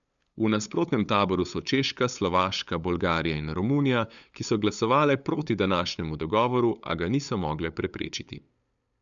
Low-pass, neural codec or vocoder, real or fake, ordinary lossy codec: 7.2 kHz; codec, 16 kHz, 8 kbps, FunCodec, trained on LibriTTS, 25 frames a second; fake; none